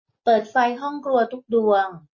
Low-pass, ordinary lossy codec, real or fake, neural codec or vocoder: 7.2 kHz; MP3, 32 kbps; real; none